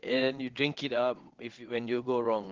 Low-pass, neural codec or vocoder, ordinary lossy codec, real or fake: 7.2 kHz; vocoder, 22.05 kHz, 80 mel bands, WaveNeXt; Opus, 16 kbps; fake